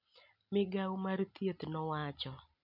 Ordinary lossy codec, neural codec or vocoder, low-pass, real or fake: none; none; 5.4 kHz; real